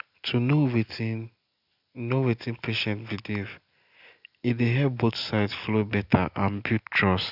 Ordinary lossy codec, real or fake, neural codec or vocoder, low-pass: none; real; none; 5.4 kHz